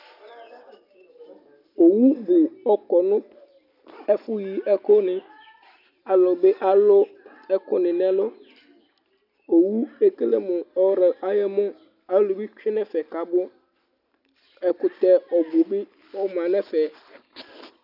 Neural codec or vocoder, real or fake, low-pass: none; real; 5.4 kHz